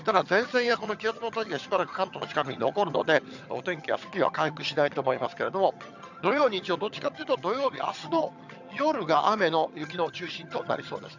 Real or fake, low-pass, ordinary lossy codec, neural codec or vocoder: fake; 7.2 kHz; none; vocoder, 22.05 kHz, 80 mel bands, HiFi-GAN